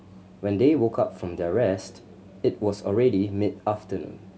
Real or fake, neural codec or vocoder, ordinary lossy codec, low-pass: real; none; none; none